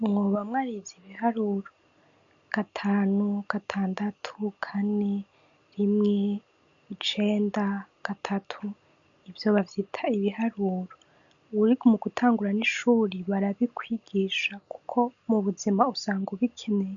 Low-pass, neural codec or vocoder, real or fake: 7.2 kHz; none; real